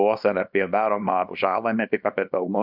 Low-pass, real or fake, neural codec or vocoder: 5.4 kHz; fake; codec, 24 kHz, 0.9 kbps, WavTokenizer, small release